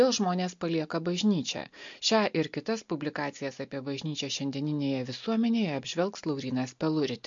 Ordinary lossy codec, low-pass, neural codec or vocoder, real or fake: MP3, 48 kbps; 7.2 kHz; none; real